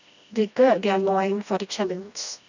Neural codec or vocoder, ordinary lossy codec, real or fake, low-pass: codec, 16 kHz, 1 kbps, FreqCodec, smaller model; none; fake; 7.2 kHz